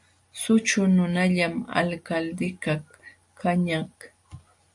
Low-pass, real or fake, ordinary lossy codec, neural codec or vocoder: 10.8 kHz; real; AAC, 64 kbps; none